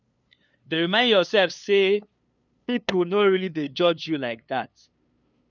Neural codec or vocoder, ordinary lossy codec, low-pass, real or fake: codec, 16 kHz, 2 kbps, FunCodec, trained on LibriTTS, 25 frames a second; none; 7.2 kHz; fake